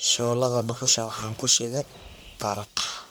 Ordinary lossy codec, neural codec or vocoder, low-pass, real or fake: none; codec, 44.1 kHz, 1.7 kbps, Pupu-Codec; none; fake